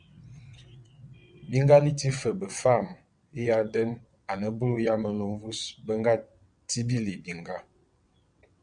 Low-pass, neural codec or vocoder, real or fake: 9.9 kHz; vocoder, 22.05 kHz, 80 mel bands, WaveNeXt; fake